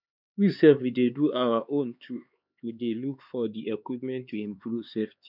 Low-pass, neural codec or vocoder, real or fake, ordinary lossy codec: 5.4 kHz; codec, 16 kHz, 4 kbps, X-Codec, HuBERT features, trained on LibriSpeech; fake; none